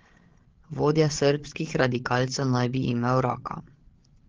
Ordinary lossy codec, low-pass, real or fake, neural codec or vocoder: Opus, 16 kbps; 7.2 kHz; fake; codec, 16 kHz, 8 kbps, FreqCodec, larger model